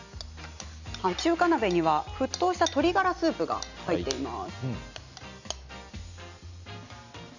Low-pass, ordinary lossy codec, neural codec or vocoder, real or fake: 7.2 kHz; none; none; real